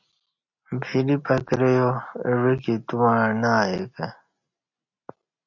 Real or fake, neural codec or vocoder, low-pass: real; none; 7.2 kHz